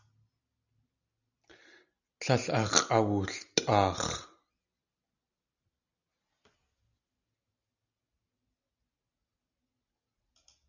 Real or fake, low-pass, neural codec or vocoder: real; 7.2 kHz; none